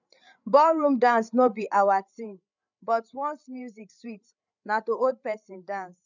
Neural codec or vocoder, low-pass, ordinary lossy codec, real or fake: codec, 16 kHz, 8 kbps, FreqCodec, larger model; 7.2 kHz; none; fake